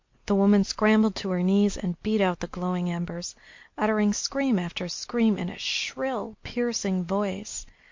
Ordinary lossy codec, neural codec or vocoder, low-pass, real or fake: MP3, 48 kbps; none; 7.2 kHz; real